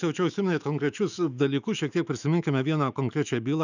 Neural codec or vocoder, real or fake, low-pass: autoencoder, 48 kHz, 128 numbers a frame, DAC-VAE, trained on Japanese speech; fake; 7.2 kHz